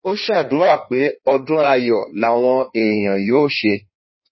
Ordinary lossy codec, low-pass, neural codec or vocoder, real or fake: MP3, 24 kbps; 7.2 kHz; codec, 16 kHz in and 24 kHz out, 1.1 kbps, FireRedTTS-2 codec; fake